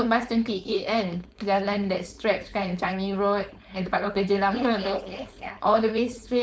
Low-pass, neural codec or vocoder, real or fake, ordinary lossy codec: none; codec, 16 kHz, 4.8 kbps, FACodec; fake; none